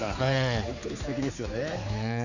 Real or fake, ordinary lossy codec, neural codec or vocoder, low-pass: fake; none; codec, 16 kHz, 4 kbps, X-Codec, HuBERT features, trained on general audio; 7.2 kHz